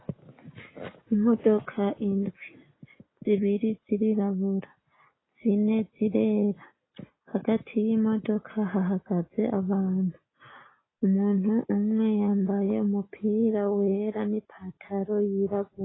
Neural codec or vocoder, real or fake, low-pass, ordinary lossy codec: none; real; 7.2 kHz; AAC, 16 kbps